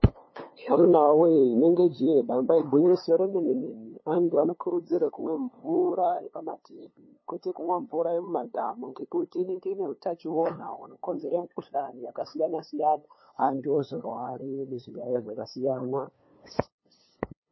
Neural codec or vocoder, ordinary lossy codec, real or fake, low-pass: codec, 16 kHz, 2 kbps, FunCodec, trained on LibriTTS, 25 frames a second; MP3, 24 kbps; fake; 7.2 kHz